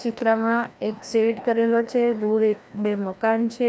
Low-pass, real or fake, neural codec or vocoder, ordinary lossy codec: none; fake; codec, 16 kHz, 1 kbps, FreqCodec, larger model; none